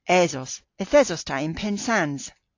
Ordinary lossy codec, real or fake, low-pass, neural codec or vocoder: AAC, 48 kbps; real; 7.2 kHz; none